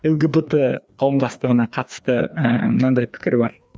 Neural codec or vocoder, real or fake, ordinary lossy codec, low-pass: codec, 16 kHz, 2 kbps, FreqCodec, larger model; fake; none; none